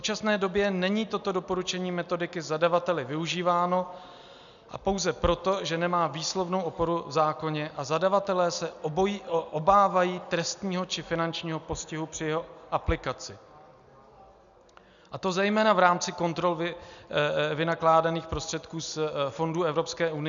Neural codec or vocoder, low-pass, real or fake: none; 7.2 kHz; real